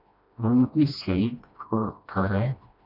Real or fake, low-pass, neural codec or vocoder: fake; 5.4 kHz; codec, 16 kHz, 1 kbps, FreqCodec, smaller model